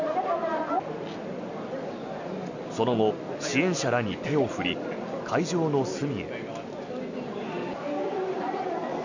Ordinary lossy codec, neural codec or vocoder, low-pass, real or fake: none; none; 7.2 kHz; real